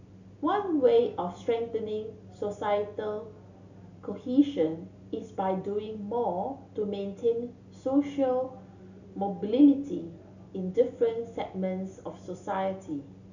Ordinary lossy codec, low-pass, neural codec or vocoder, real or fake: none; 7.2 kHz; none; real